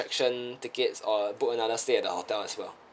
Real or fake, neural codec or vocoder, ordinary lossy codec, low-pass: real; none; none; none